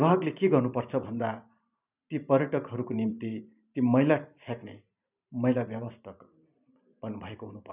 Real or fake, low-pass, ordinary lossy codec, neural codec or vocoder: fake; 3.6 kHz; none; vocoder, 44.1 kHz, 128 mel bands every 512 samples, BigVGAN v2